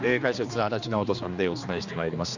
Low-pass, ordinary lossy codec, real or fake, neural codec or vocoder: 7.2 kHz; none; fake; codec, 16 kHz, 2 kbps, X-Codec, HuBERT features, trained on general audio